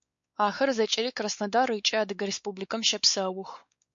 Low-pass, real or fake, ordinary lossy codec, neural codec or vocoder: 7.2 kHz; fake; MP3, 48 kbps; codec, 16 kHz, 4 kbps, X-Codec, WavLM features, trained on Multilingual LibriSpeech